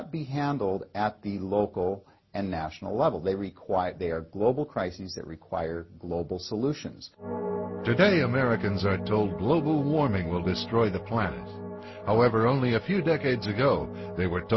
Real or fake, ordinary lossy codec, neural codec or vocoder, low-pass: real; MP3, 24 kbps; none; 7.2 kHz